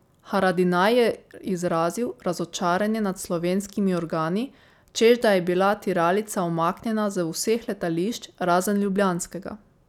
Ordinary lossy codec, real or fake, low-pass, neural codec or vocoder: none; real; 19.8 kHz; none